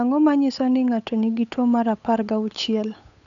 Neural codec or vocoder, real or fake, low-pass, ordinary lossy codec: codec, 16 kHz, 8 kbps, FunCodec, trained on Chinese and English, 25 frames a second; fake; 7.2 kHz; none